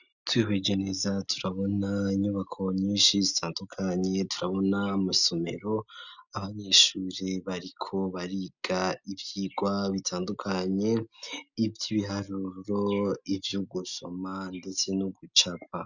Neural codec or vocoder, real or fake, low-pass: none; real; 7.2 kHz